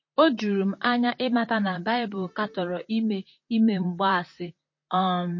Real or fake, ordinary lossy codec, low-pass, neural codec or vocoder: fake; MP3, 32 kbps; 7.2 kHz; vocoder, 44.1 kHz, 128 mel bands, Pupu-Vocoder